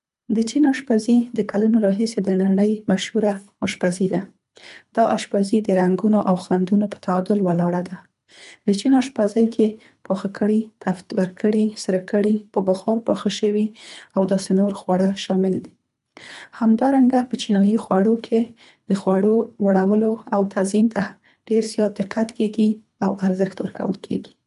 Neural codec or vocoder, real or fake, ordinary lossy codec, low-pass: codec, 24 kHz, 3 kbps, HILCodec; fake; MP3, 96 kbps; 10.8 kHz